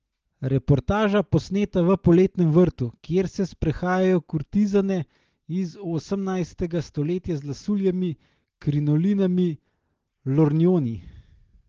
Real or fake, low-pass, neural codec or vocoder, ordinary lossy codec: real; 7.2 kHz; none; Opus, 16 kbps